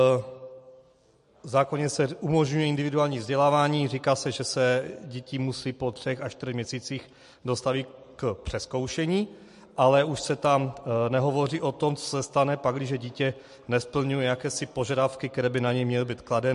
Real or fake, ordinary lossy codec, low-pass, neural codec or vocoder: real; MP3, 48 kbps; 14.4 kHz; none